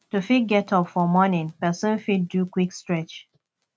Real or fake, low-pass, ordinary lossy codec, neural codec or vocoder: real; none; none; none